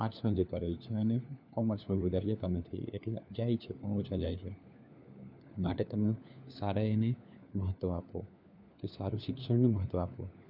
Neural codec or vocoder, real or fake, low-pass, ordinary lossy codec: codec, 16 kHz, 4 kbps, FunCodec, trained on LibriTTS, 50 frames a second; fake; 5.4 kHz; none